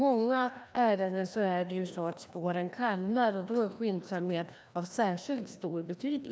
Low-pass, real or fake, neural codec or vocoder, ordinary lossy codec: none; fake; codec, 16 kHz, 1 kbps, FreqCodec, larger model; none